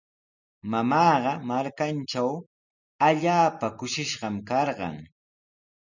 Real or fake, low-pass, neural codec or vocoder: real; 7.2 kHz; none